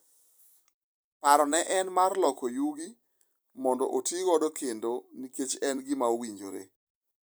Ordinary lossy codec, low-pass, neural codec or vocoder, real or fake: none; none; vocoder, 44.1 kHz, 128 mel bands every 256 samples, BigVGAN v2; fake